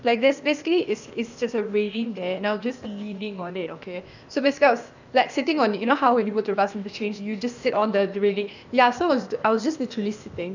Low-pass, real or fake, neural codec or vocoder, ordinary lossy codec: 7.2 kHz; fake; codec, 16 kHz, 0.8 kbps, ZipCodec; none